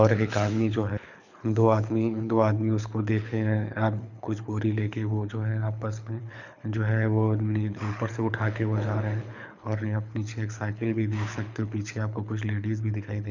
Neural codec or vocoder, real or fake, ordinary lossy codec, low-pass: codec, 24 kHz, 6 kbps, HILCodec; fake; none; 7.2 kHz